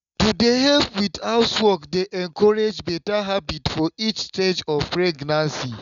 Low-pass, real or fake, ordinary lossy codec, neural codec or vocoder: 7.2 kHz; real; none; none